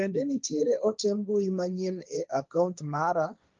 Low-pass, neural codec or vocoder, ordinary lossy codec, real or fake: 7.2 kHz; codec, 16 kHz, 1.1 kbps, Voila-Tokenizer; Opus, 32 kbps; fake